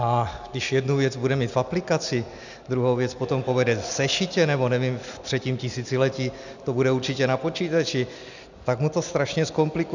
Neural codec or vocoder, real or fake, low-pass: none; real; 7.2 kHz